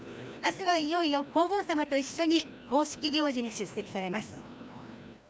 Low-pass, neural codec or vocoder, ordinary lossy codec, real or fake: none; codec, 16 kHz, 1 kbps, FreqCodec, larger model; none; fake